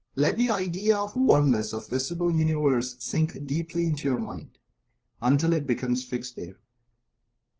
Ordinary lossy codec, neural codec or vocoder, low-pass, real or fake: Opus, 16 kbps; codec, 16 kHz, 2 kbps, FunCodec, trained on LibriTTS, 25 frames a second; 7.2 kHz; fake